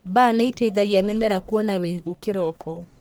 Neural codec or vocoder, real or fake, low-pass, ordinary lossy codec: codec, 44.1 kHz, 1.7 kbps, Pupu-Codec; fake; none; none